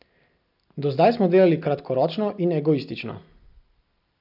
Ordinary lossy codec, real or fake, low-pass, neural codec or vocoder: none; real; 5.4 kHz; none